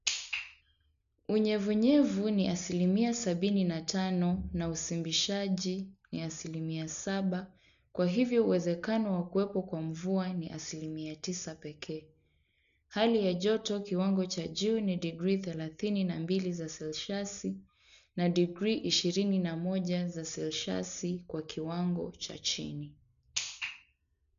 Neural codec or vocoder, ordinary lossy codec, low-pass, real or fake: none; none; 7.2 kHz; real